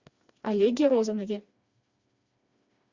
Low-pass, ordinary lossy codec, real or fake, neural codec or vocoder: 7.2 kHz; Opus, 64 kbps; fake; codec, 16 kHz, 2 kbps, FreqCodec, smaller model